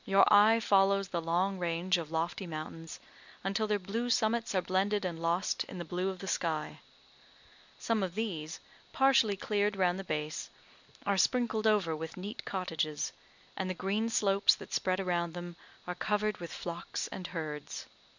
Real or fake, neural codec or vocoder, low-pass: real; none; 7.2 kHz